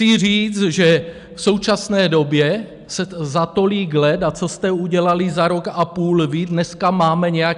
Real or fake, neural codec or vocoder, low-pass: real; none; 10.8 kHz